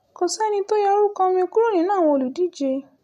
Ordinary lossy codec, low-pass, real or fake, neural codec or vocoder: none; 14.4 kHz; real; none